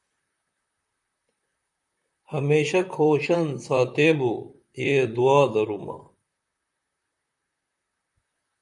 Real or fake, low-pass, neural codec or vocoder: fake; 10.8 kHz; vocoder, 44.1 kHz, 128 mel bands, Pupu-Vocoder